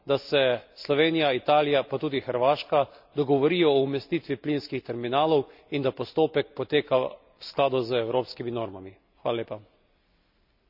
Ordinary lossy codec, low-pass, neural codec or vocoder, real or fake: none; 5.4 kHz; none; real